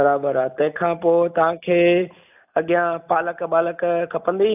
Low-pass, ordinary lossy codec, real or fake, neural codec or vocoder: 3.6 kHz; AAC, 32 kbps; real; none